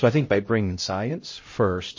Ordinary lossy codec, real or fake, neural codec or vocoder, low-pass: MP3, 32 kbps; fake; codec, 16 kHz, 0.5 kbps, X-Codec, HuBERT features, trained on LibriSpeech; 7.2 kHz